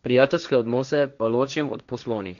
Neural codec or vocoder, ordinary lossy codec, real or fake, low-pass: codec, 16 kHz, 1.1 kbps, Voila-Tokenizer; none; fake; 7.2 kHz